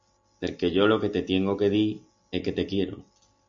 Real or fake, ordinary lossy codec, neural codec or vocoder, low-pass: real; AAC, 64 kbps; none; 7.2 kHz